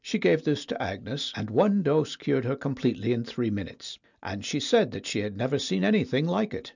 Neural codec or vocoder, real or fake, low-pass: none; real; 7.2 kHz